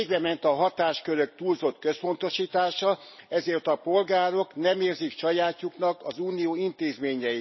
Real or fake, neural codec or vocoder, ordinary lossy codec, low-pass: real; none; MP3, 24 kbps; 7.2 kHz